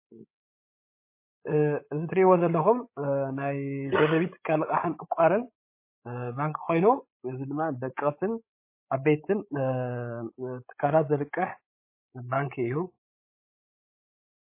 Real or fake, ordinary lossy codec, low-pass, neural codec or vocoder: fake; MP3, 24 kbps; 3.6 kHz; codec, 16 kHz, 16 kbps, FreqCodec, larger model